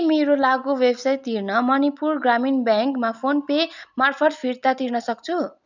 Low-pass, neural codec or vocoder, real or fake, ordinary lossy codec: 7.2 kHz; none; real; none